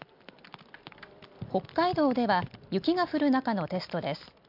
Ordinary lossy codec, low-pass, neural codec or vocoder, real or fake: MP3, 48 kbps; 5.4 kHz; none; real